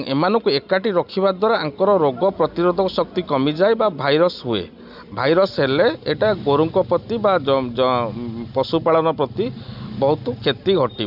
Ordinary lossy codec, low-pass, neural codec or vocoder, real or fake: none; 5.4 kHz; none; real